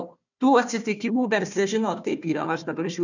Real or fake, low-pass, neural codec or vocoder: fake; 7.2 kHz; codec, 16 kHz, 1 kbps, FunCodec, trained on Chinese and English, 50 frames a second